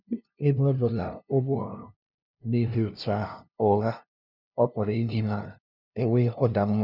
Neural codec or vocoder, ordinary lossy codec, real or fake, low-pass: codec, 16 kHz, 0.5 kbps, FunCodec, trained on LibriTTS, 25 frames a second; none; fake; 5.4 kHz